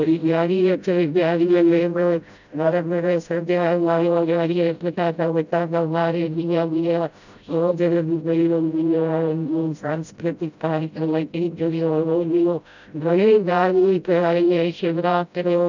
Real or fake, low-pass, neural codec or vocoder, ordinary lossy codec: fake; 7.2 kHz; codec, 16 kHz, 0.5 kbps, FreqCodec, smaller model; none